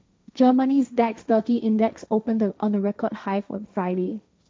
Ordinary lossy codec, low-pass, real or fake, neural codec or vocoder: none; none; fake; codec, 16 kHz, 1.1 kbps, Voila-Tokenizer